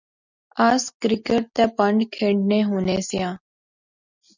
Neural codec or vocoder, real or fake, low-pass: none; real; 7.2 kHz